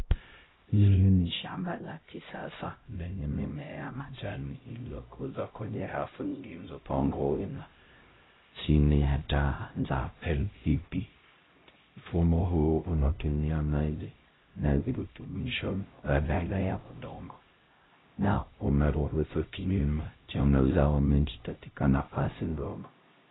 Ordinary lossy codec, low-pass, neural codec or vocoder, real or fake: AAC, 16 kbps; 7.2 kHz; codec, 16 kHz, 0.5 kbps, X-Codec, HuBERT features, trained on LibriSpeech; fake